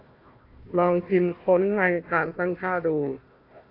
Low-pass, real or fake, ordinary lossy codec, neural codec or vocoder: 5.4 kHz; fake; AAC, 32 kbps; codec, 16 kHz, 1 kbps, FunCodec, trained on Chinese and English, 50 frames a second